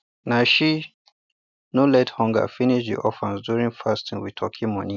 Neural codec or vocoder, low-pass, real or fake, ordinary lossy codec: none; 7.2 kHz; real; none